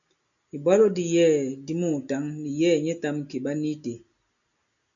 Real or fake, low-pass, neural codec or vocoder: real; 7.2 kHz; none